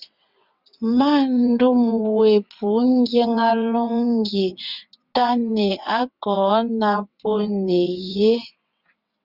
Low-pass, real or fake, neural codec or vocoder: 5.4 kHz; fake; vocoder, 22.05 kHz, 80 mel bands, WaveNeXt